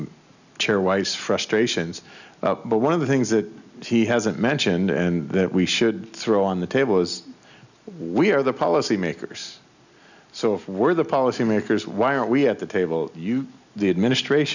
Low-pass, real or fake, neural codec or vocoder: 7.2 kHz; real; none